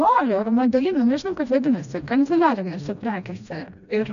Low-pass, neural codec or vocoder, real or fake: 7.2 kHz; codec, 16 kHz, 1 kbps, FreqCodec, smaller model; fake